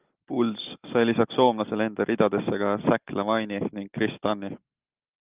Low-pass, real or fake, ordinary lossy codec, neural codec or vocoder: 3.6 kHz; real; Opus, 24 kbps; none